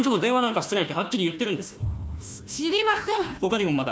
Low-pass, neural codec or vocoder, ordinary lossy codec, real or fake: none; codec, 16 kHz, 1 kbps, FunCodec, trained on Chinese and English, 50 frames a second; none; fake